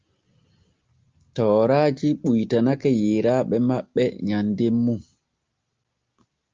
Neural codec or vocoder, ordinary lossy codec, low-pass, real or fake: none; Opus, 24 kbps; 7.2 kHz; real